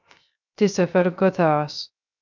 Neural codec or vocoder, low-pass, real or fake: codec, 16 kHz, 0.3 kbps, FocalCodec; 7.2 kHz; fake